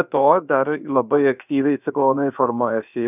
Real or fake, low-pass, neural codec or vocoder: fake; 3.6 kHz; codec, 16 kHz, about 1 kbps, DyCAST, with the encoder's durations